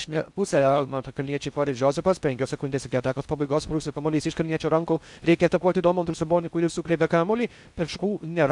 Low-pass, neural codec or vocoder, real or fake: 10.8 kHz; codec, 16 kHz in and 24 kHz out, 0.6 kbps, FocalCodec, streaming, 4096 codes; fake